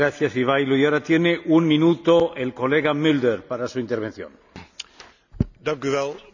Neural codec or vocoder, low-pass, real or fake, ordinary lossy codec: none; 7.2 kHz; real; none